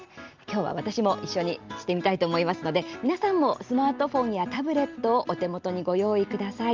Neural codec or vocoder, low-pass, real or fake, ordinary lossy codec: none; 7.2 kHz; real; Opus, 32 kbps